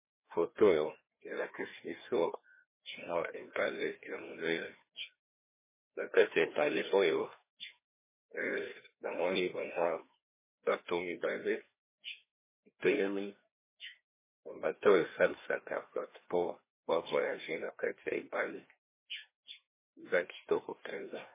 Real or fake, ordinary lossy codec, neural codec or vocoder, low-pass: fake; MP3, 16 kbps; codec, 16 kHz, 1 kbps, FreqCodec, larger model; 3.6 kHz